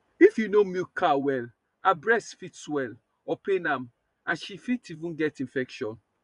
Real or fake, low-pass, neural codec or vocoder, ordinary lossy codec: fake; 10.8 kHz; vocoder, 24 kHz, 100 mel bands, Vocos; none